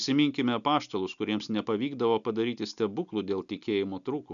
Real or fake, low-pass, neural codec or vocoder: real; 7.2 kHz; none